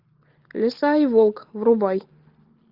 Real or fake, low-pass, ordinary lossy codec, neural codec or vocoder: real; 5.4 kHz; Opus, 24 kbps; none